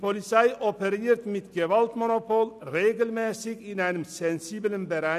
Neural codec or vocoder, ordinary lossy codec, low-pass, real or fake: none; none; 14.4 kHz; real